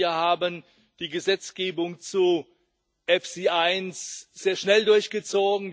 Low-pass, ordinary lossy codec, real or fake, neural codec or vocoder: none; none; real; none